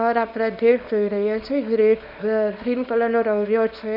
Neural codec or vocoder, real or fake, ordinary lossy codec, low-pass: codec, 24 kHz, 0.9 kbps, WavTokenizer, small release; fake; none; 5.4 kHz